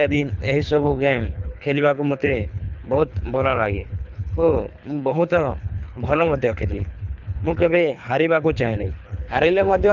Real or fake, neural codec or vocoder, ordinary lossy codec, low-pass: fake; codec, 24 kHz, 3 kbps, HILCodec; none; 7.2 kHz